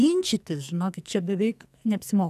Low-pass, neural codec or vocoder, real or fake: 14.4 kHz; codec, 44.1 kHz, 2.6 kbps, SNAC; fake